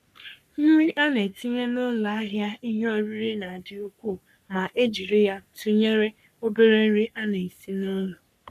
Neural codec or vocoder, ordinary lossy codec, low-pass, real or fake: codec, 44.1 kHz, 3.4 kbps, Pupu-Codec; none; 14.4 kHz; fake